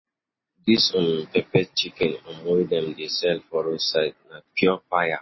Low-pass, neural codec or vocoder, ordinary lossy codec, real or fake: 7.2 kHz; none; MP3, 24 kbps; real